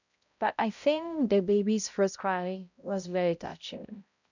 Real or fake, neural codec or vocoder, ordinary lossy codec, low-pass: fake; codec, 16 kHz, 0.5 kbps, X-Codec, HuBERT features, trained on balanced general audio; none; 7.2 kHz